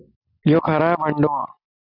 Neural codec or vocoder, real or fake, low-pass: none; real; 5.4 kHz